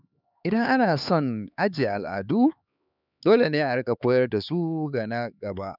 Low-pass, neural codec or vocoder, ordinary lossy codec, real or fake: 5.4 kHz; codec, 16 kHz, 4 kbps, X-Codec, HuBERT features, trained on LibriSpeech; none; fake